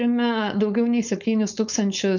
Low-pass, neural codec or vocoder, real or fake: 7.2 kHz; codec, 16 kHz, 4.8 kbps, FACodec; fake